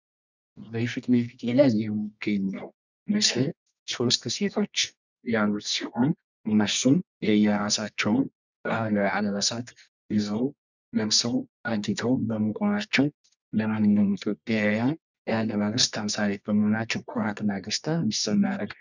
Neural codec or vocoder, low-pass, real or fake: codec, 24 kHz, 0.9 kbps, WavTokenizer, medium music audio release; 7.2 kHz; fake